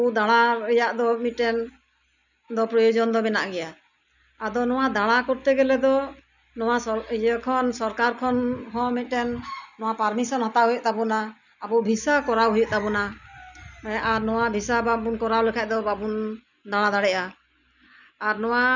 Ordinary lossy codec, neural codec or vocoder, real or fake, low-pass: none; none; real; 7.2 kHz